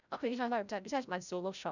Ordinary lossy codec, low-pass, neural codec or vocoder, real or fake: none; 7.2 kHz; codec, 16 kHz, 0.5 kbps, FreqCodec, larger model; fake